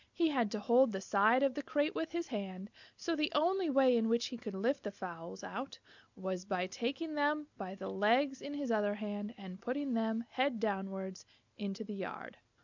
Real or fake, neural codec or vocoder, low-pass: real; none; 7.2 kHz